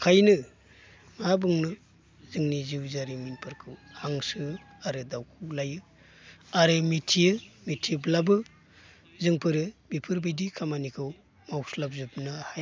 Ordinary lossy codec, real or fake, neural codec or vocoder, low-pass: none; real; none; none